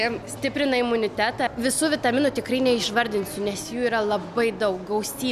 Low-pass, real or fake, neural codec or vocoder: 14.4 kHz; real; none